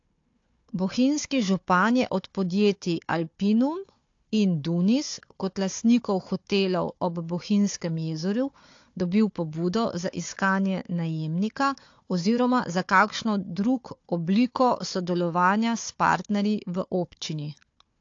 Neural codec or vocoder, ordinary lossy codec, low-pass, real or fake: codec, 16 kHz, 4 kbps, FunCodec, trained on Chinese and English, 50 frames a second; AAC, 48 kbps; 7.2 kHz; fake